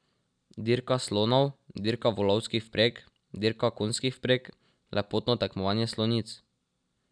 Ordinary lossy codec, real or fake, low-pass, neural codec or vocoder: none; real; 9.9 kHz; none